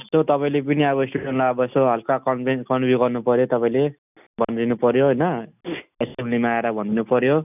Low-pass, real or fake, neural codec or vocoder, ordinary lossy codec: 3.6 kHz; real; none; none